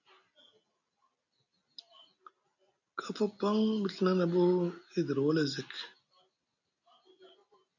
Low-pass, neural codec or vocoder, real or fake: 7.2 kHz; none; real